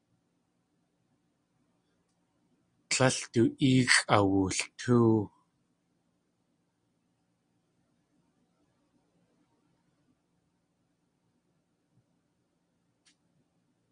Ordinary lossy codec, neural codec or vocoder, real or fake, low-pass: Opus, 64 kbps; none; real; 9.9 kHz